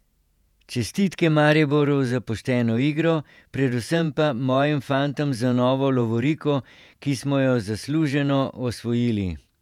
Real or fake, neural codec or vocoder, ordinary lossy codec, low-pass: fake; vocoder, 48 kHz, 128 mel bands, Vocos; none; 19.8 kHz